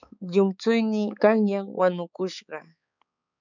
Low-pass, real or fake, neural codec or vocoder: 7.2 kHz; fake; codec, 16 kHz, 4 kbps, X-Codec, HuBERT features, trained on balanced general audio